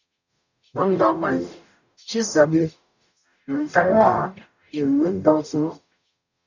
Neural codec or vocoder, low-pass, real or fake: codec, 44.1 kHz, 0.9 kbps, DAC; 7.2 kHz; fake